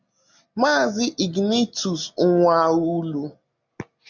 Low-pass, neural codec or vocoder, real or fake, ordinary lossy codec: 7.2 kHz; none; real; MP3, 64 kbps